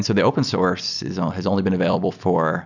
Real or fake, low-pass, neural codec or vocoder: real; 7.2 kHz; none